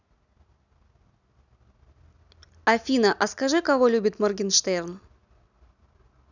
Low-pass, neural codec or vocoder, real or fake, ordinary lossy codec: 7.2 kHz; none; real; none